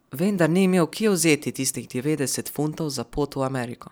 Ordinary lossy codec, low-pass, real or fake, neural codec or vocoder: none; none; fake; vocoder, 44.1 kHz, 128 mel bands every 512 samples, BigVGAN v2